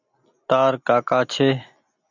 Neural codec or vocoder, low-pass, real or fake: none; 7.2 kHz; real